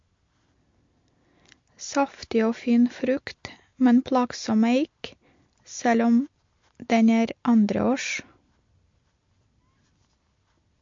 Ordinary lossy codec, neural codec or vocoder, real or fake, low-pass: MP3, 48 kbps; none; real; 7.2 kHz